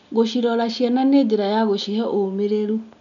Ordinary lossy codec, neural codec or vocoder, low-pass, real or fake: none; none; 7.2 kHz; real